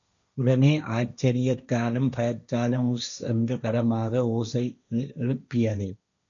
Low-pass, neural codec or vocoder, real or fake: 7.2 kHz; codec, 16 kHz, 1.1 kbps, Voila-Tokenizer; fake